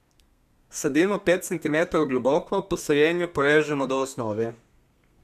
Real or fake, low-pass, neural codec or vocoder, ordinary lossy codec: fake; 14.4 kHz; codec, 32 kHz, 1.9 kbps, SNAC; none